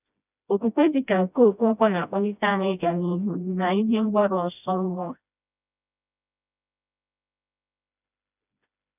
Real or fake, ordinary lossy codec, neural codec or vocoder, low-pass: fake; none; codec, 16 kHz, 1 kbps, FreqCodec, smaller model; 3.6 kHz